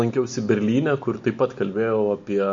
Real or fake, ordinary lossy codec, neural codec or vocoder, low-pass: real; MP3, 48 kbps; none; 7.2 kHz